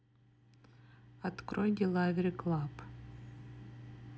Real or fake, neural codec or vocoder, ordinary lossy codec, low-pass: real; none; none; none